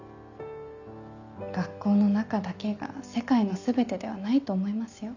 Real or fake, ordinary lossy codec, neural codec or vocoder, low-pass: real; none; none; 7.2 kHz